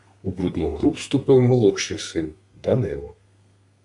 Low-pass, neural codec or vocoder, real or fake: 10.8 kHz; codec, 32 kHz, 1.9 kbps, SNAC; fake